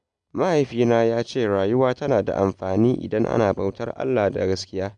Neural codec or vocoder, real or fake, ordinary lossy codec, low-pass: none; real; none; 9.9 kHz